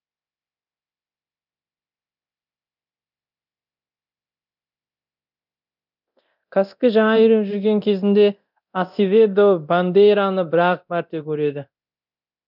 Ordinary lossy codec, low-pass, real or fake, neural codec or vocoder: none; 5.4 kHz; fake; codec, 24 kHz, 0.9 kbps, DualCodec